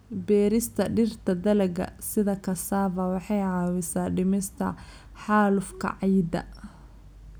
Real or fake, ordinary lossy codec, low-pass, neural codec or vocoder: real; none; none; none